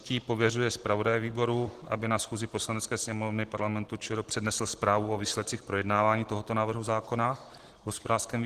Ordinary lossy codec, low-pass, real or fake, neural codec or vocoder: Opus, 16 kbps; 14.4 kHz; real; none